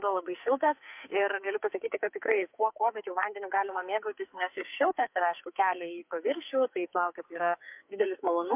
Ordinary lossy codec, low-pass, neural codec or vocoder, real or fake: MP3, 32 kbps; 3.6 kHz; codec, 44.1 kHz, 2.6 kbps, SNAC; fake